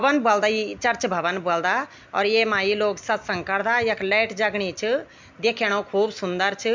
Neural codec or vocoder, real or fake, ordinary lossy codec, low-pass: none; real; MP3, 64 kbps; 7.2 kHz